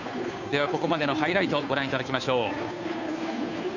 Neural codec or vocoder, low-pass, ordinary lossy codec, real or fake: codec, 16 kHz, 2 kbps, FunCodec, trained on Chinese and English, 25 frames a second; 7.2 kHz; none; fake